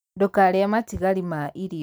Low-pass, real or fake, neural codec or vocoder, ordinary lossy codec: none; real; none; none